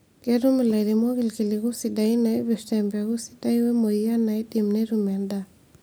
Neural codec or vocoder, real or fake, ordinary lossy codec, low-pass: none; real; none; none